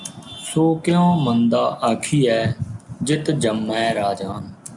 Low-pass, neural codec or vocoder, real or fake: 10.8 kHz; none; real